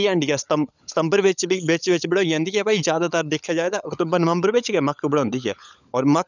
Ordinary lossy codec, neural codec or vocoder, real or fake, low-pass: none; codec, 16 kHz, 16 kbps, FunCodec, trained on LibriTTS, 50 frames a second; fake; 7.2 kHz